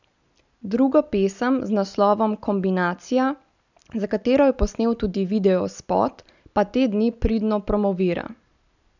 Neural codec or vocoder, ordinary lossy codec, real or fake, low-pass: none; none; real; 7.2 kHz